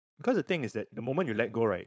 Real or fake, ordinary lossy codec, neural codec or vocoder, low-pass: fake; none; codec, 16 kHz, 4.8 kbps, FACodec; none